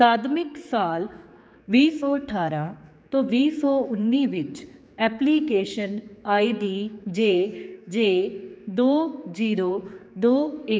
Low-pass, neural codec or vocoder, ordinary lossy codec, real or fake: none; codec, 16 kHz, 4 kbps, X-Codec, HuBERT features, trained on general audio; none; fake